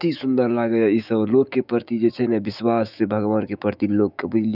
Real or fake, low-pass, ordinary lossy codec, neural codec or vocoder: fake; 5.4 kHz; none; autoencoder, 48 kHz, 128 numbers a frame, DAC-VAE, trained on Japanese speech